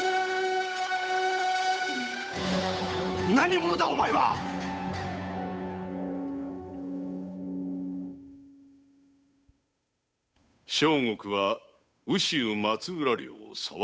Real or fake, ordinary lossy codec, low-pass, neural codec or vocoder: fake; none; none; codec, 16 kHz, 8 kbps, FunCodec, trained on Chinese and English, 25 frames a second